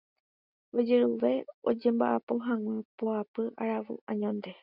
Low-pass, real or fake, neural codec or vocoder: 5.4 kHz; real; none